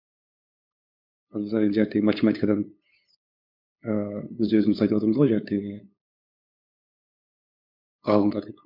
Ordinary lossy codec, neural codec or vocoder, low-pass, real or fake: MP3, 48 kbps; codec, 16 kHz, 8 kbps, FunCodec, trained on LibriTTS, 25 frames a second; 5.4 kHz; fake